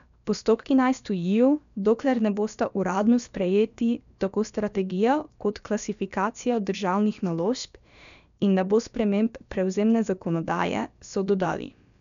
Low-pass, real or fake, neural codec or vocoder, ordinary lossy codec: 7.2 kHz; fake; codec, 16 kHz, about 1 kbps, DyCAST, with the encoder's durations; none